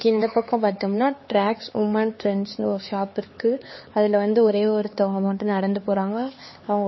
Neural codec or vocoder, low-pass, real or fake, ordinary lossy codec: codec, 16 kHz, 4 kbps, X-Codec, HuBERT features, trained on LibriSpeech; 7.2 kHz; fake; MP3, 24 kbps